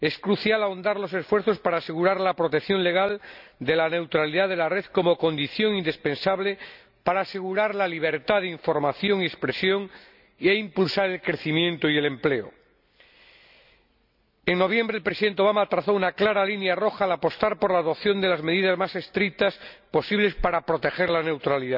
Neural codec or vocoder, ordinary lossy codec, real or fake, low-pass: none; none; real; 5.4 kHz